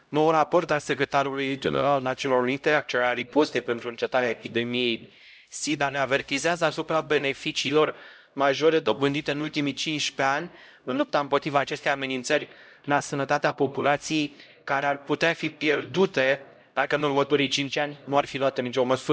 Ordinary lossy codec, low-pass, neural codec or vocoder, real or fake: none; none; codec, 16 kHz, 0.5 kbps, X-Codec, HuBERT features, trained on LibriSpeech; fake